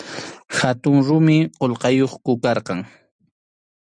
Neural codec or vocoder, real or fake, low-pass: none; real; 9.9 kHz